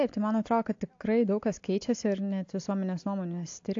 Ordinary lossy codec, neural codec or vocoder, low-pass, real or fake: AAC, 48 kbps; none; 7.2 kHz; real